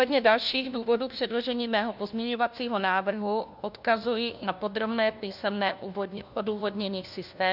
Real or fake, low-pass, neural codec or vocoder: fake; 5.4 kHz; codec, 16 kHz, 1 kbps, FunCodec, trained on LibriTTS, 50 frames a second